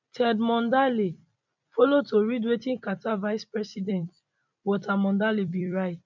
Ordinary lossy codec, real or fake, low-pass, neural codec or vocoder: none; fake; 7.2 kHz; vocoder, 44.1 kHz, 128 mel bands every 256 samples, BigVGAN v2